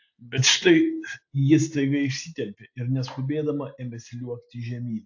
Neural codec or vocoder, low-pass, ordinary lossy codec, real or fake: none; 7.2 kHz; AAC, 48 kbps; real